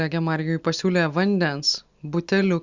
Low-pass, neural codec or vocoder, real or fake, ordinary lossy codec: 7.2 kHz; none; real; Opus, 64 kbps